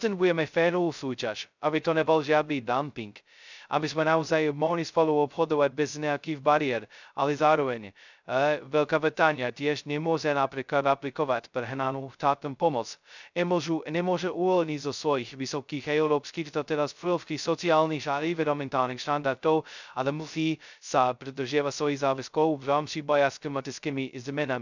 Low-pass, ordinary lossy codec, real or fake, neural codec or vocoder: 7.2 kHz; none; fake; codec, 16 kHz, 0.2 kbps, FocalCodec